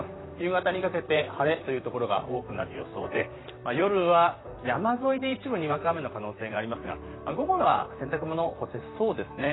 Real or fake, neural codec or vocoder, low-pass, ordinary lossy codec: fake; vocoder, 44.1 kHz, 128 mel bands, Pupu-Vocoder; 7.2 kHz; AAC, 16 kbps